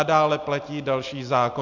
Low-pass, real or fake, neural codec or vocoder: 7.2 kHz; real; none